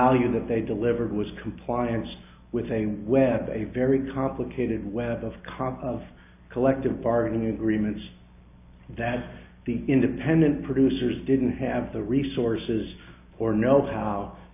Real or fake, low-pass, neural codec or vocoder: real; 3.6 kHz; none